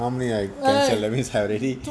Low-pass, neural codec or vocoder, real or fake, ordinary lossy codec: none; none; real; none